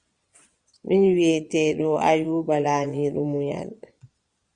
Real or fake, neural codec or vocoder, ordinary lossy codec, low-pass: fake; vocoder, 22.05 kHz, 80 mel bands, Vocos; Opus, 64 kbps; 9.9 kHz